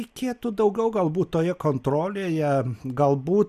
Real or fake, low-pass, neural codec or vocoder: real; 14.4 kHz; none